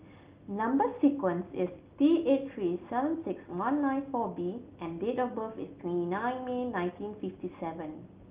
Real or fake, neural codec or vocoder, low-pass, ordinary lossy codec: real; none; 3.6 kHz; Opus, 32 kbps